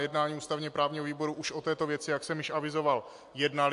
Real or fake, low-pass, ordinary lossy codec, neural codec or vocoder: real; 10.8 kHz; AAC, 64 kbps; none